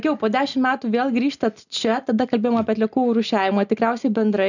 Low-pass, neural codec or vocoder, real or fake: 7.2 kHz; none; real